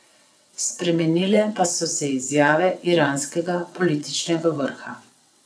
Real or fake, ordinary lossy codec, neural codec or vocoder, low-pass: fake; none; vocoder, 22.05 kHz, 80 mel bands, WaveNeXt; none